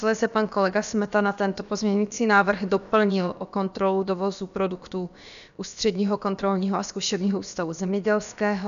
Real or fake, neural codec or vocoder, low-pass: fake; codec, 16 kHz, about 1 kbps, DyCAST, with the encoder's durations; 7.2 kHz